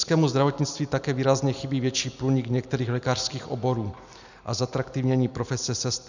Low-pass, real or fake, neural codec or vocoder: 7.2 kHz; real; none